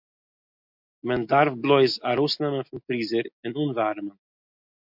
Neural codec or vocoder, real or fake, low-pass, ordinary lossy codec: none; real; 5.4 kHz; MP3, 48 kbps